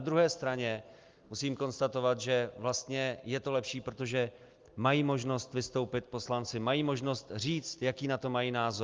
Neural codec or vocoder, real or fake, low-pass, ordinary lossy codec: none; real; 7.2 kHz; Opus, 32 kbps